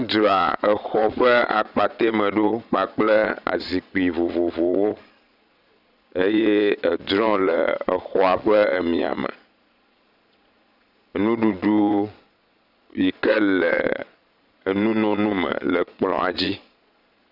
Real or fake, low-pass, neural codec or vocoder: fake; 5.4 kHz; vocoder, 22.05 kHz, 80 mel bands, Vocos